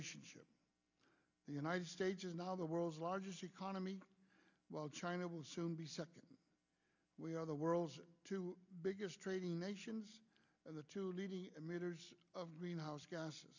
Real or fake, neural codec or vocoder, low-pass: real; none; 7.2 kHz